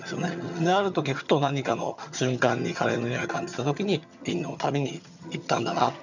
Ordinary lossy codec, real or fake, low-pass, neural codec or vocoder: none; fake; 7.2 kHz; vocoder, 22.05 kHz, 80 mel bands, HiFi-GAN